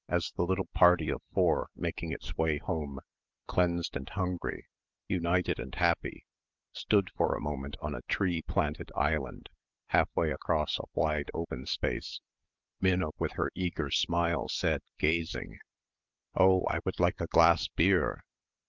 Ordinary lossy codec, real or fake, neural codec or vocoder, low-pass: Opus, 24 kbps; real; none; 7.2 kHz